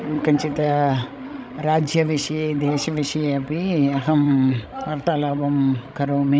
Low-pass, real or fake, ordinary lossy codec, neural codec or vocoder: none; fake; none; codec, 16 kHz, 16 kbps, FreqCodec, larger model